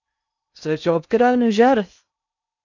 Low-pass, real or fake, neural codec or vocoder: 7.2 kHz; fake; codec, 16 kHz in and 24 kHz out, 0.6 kbps, FocalCodec, streaming, 2048 codes